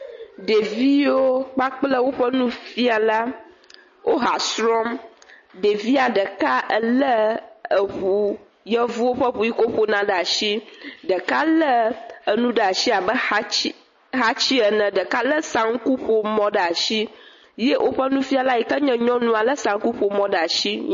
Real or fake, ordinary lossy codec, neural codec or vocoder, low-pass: real; MP3, 32 kbps; none; 7.2 kHz